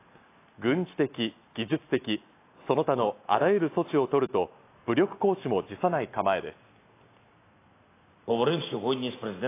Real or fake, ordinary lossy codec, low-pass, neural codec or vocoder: real; AAC, 24 kbps; 3.6 kHz; none